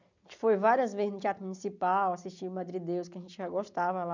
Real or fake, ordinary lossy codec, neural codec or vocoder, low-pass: real; none; none; 7.2 kHz